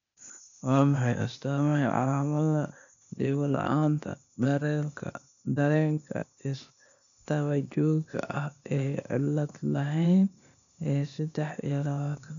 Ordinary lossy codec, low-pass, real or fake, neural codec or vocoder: none; 7.2 kHz; fake; codec, 16 kHz, 0.8 kbps, ZipCodec